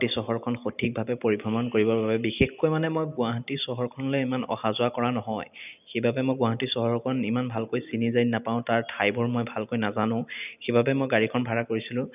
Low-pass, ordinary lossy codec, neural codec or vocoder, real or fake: 3.6 kHz; none; none; real